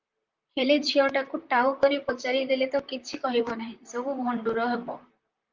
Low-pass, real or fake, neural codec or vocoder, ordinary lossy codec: 7.2 kHz; fake; vocoder, 44.1 kHz, 128 mel bands, Pupu-Vocoder; Opus, 32 kbps